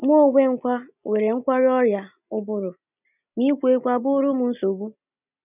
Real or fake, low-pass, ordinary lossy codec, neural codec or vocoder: real; 3.6 kHz; none; none